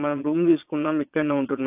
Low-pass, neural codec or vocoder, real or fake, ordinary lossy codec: 3.6 kHz; vocoder, 22.05 kHz, 80 mel bands, Vocos; fake; AAC, 32 kbps